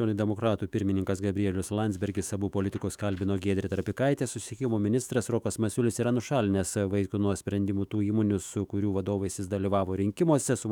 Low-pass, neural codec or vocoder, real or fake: 19.8 kHz; autoencoder, 48 kHz, 128 numbers a frame, DAC-VAE, trained on Japanese speech; fake